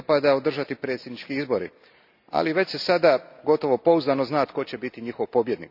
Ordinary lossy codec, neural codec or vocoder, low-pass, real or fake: none; none; 5.4 kHz; real